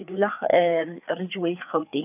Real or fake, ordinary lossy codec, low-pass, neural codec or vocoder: fake; none; 3.6 kHz; codec, 16 kHz, 4 kbps, FreqCodec, larger model